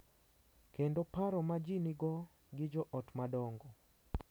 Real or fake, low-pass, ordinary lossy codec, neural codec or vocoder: real; none; none; none